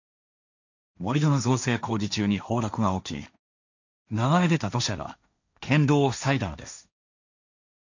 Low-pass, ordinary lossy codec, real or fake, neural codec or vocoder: 7.2 kHz; none; fake; codec, 16 kHz, 1.1 kbps, Voila-Tokenizer